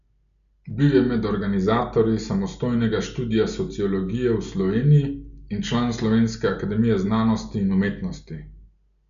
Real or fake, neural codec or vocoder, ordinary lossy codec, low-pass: real; none; none; 7.2 kHz